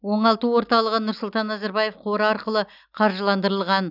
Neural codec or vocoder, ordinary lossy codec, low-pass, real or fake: none; none; 5.4 kHz; real